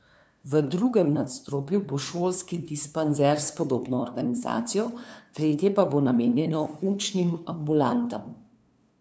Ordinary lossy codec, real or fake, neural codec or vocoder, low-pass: none; fake; codec, 16 kHz, 2 kbps, FunCodec, trained on LibriTTS, 25 frames a second; none